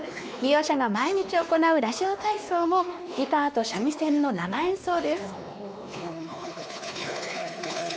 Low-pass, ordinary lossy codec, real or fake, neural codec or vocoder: none; none; fake; codec, 16 kHz, 2 kbps, X-Codec, WavLM features, trained on Multilingual LibriSpeech